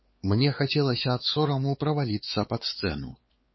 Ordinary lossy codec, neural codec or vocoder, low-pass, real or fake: MP3, 24 kbps; codec, 16 kHz, 4 kbps, X-Codec, WavLM features, trained on Multilingual LibriSpeech; 7.2 kHz; fake